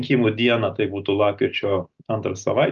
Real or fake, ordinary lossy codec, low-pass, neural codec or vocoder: real; Opus, 24 kbps; 7.2 kHz; none